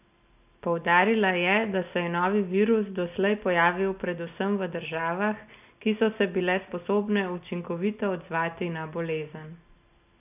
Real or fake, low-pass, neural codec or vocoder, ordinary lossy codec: real; 3.6 kHz; none; none